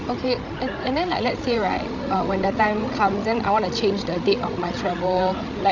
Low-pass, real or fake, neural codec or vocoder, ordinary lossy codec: 7.2 kHz; fake; codec, 16 kHz, 16 kbps, FreqCodec, larger model; none